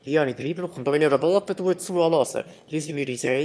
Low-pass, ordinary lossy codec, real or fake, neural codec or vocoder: none; none; fake; autoencoder, 22.05 kHz, a latent of 192 numbers a frame, VITS, trained on one speaker